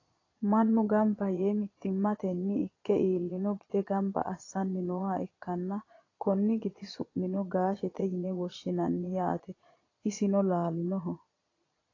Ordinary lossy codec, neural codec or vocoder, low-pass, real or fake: AAC, 32 kbps; vocoder, 22.05 kHz, 80 mel bands, Vocos; 7.2 kHz; fake